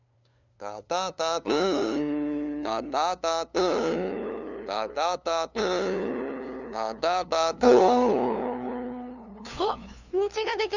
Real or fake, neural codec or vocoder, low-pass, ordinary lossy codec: fake; codec, 16 kHz, 2 kbps, FunCodec, trained on LibriTTS, 25 frames a second; 7.2 kHz; none